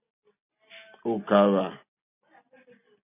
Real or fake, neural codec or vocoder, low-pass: real; none; 3.6 kHz